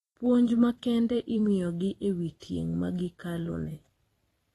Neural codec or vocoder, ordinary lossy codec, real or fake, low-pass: vocoder, 44.1 kHz, 128 mel bands every 256 samples, BigVGAN v2; AAC, 32 kbps; fake; 19.8 kHz